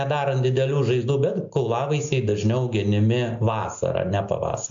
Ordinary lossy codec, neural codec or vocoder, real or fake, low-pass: AAC, 64 kbps; none; real; 7.2 kHz